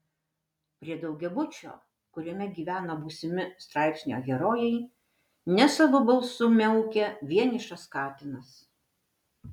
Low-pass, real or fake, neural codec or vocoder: 19.8 kHz; real; none